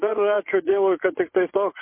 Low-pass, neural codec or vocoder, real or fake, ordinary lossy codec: 3.6 kHz; vocoder, 24 kHz, 100 mel bands, Vocos; fake; MP3, 24 kbps